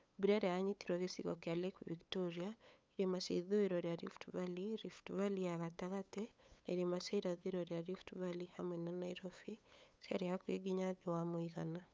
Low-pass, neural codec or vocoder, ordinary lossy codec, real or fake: none; codec, 16 kHz, 8 kbps, FunCodec, trained on LibriTTS, 25 frames a second; none; fake